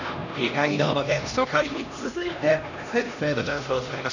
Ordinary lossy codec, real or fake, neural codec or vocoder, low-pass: none; fake; codec, 16 kHz, 1 kbps, X-Codec, HuBERT features, trained on LibriSpeech; 7.2 kHz